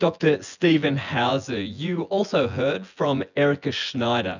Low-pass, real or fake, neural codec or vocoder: 7.2 kHz; fake; vocoder, 24 kHz, 100 mel bands, Vocos